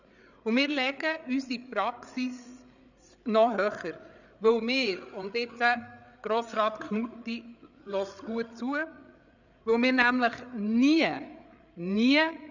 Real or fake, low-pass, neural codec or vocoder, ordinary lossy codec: fake; 7.2 kHz; codec, 16 kHz, 8 kbps, FreqCodec, larger model; none